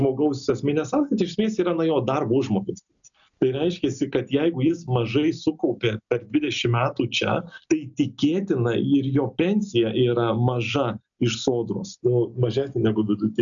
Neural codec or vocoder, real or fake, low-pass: none; real; 7.2 kHz